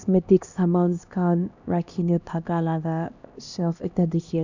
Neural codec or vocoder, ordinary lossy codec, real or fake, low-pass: codec, 16 kHz, 1 kbps, X-Codec, HuBERT features, trained on LibriSpeech; none; fake; 7.2 kHz